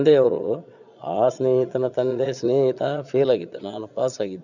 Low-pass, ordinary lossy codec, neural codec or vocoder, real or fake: 7.2 kHz; none; vocoder, 22.05 kHz, 80 mel bands, Vocos; fake